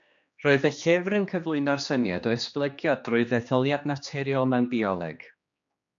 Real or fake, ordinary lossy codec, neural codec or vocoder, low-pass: fake; MP3, 64 kbps; codec, 16 kHz, 2 kbps, X-Codec, HuBERT features, trained on general audio; 7.2 kHz